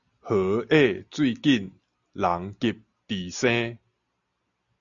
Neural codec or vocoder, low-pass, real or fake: none; 7.2 kHz; real